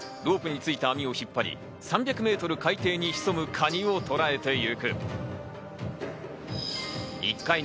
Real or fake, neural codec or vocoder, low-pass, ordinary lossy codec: real; none; none; none